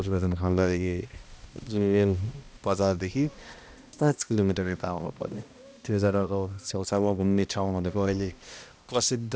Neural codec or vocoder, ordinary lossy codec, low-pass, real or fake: codec, 16 kHz, 1 kbps, X-Codec, HuBERT features, trained on balanced general audio; none; none; fake